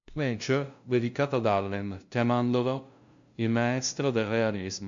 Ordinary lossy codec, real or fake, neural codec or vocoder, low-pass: MP3, 64 kbps; fake; codec, 16 kHz, 0.5 kbps, FunCodec, trained on LibriTTS, 25 frames a second; 7.2 kHz